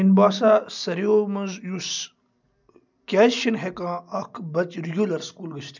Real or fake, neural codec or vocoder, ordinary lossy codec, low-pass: real; none; none; 7.2 kHz